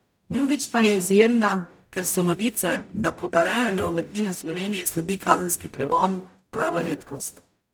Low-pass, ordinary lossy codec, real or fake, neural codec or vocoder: none; none; fake; codec, 44.1 kHz, 0.9 kbps, DAC